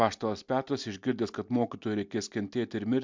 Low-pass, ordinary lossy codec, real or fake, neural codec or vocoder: 7.2 kHz; MP3, 64 kbps; real; none